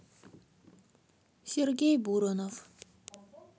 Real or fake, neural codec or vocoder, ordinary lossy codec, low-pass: real; none; none; none